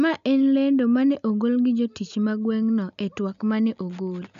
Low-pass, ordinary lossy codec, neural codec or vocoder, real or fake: 7.2 kHz; none; none; real